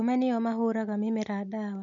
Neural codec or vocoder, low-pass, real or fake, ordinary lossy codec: none; 7.2 kHz; real; none